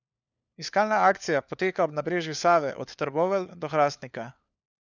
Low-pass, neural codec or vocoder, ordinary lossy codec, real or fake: 7.2 kHz; codec, 16 kHz, 4 kbps, FunCodec, trained on LibriTTS, 50 frames a second; none; fake